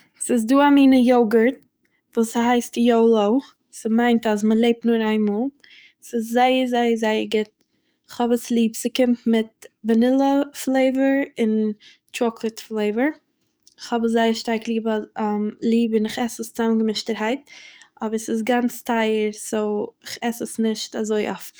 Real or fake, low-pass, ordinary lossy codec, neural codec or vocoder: fake; none; none; codec, 44.1 kHz, 7.8 kbps, DAC